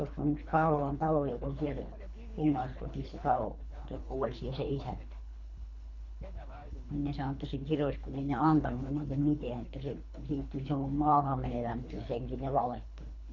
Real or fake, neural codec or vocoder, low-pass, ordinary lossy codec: fake; codec, 24 kHz, 3 kbps, HILCodec; 7.2 kHz; none